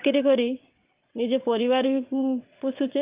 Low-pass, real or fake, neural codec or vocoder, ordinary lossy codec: 3.6 kHz; fake; vocoder, 22.05 kHz, 80 mel bands, WaveNeXt; Opus, 24 kbps